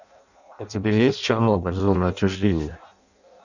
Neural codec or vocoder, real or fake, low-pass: codec, 16 kHz in and 24 kHz out, 0.6 kbps, FireRedTTS-2 codec; fake; 7.2 kHz